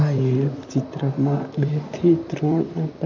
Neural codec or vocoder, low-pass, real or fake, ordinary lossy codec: vocoder, 44.1 kHz, 128 mel bands, Pupu-Vocoder; 7.2 kHz; fake; none